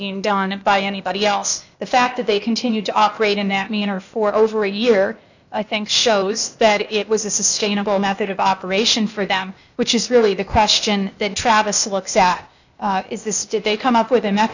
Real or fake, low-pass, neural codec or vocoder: fake; 7.2 kHz; codec, 16 kHz, 0.8 kbps, ZipCodec